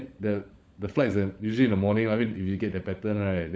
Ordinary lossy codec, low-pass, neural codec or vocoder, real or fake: none; none; codec, 16 kHz, 16 kbps, FunCodec, trained on LibriTTS, 50 frames a second; fake